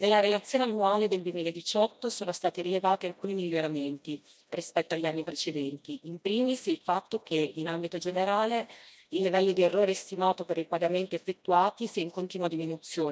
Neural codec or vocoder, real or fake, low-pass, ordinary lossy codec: codec, 16 kHz, 1 kbps, FreqCodec, smaller model; fake; none; none